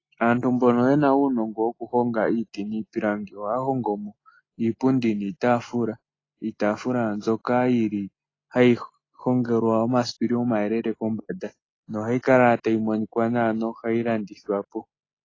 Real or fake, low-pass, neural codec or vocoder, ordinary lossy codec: real; 7.2 kHz; none; AAC, 32 kbps